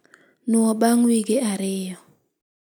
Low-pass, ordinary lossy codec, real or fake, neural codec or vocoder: none; none; real; none